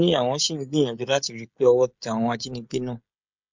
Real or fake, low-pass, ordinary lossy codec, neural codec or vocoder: fake; 7.2 kHz; MP3, 64 kbps; codec, 16 kHz, 16 kbps, FreqCodec, smaller model